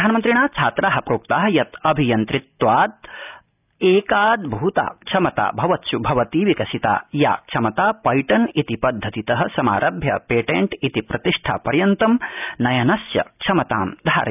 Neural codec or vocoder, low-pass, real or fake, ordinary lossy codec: none; 3.6 kHz; real; none